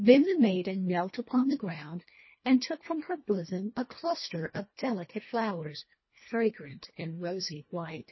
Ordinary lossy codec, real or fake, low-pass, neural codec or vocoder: MP3, 24 kbps; fake; 7.2 kHz; codec, 24 kHz, 1.5 kbps, HILCodec